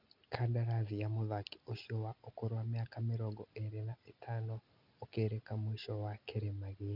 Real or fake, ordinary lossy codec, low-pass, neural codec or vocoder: real; none; 5.4 kHz; none